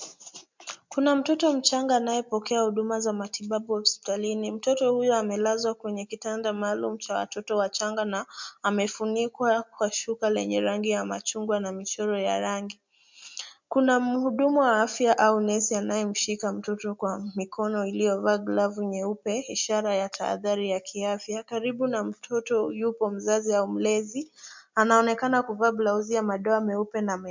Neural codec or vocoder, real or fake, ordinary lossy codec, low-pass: none; real; MP3, 64 kbps; 7.2 kHz